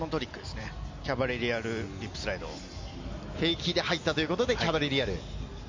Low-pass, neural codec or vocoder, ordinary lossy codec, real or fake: 7.2 kHz; none; MP3, 64 kbps; real